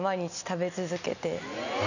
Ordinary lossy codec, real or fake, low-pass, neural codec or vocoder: none; real; 7.2 kHz; none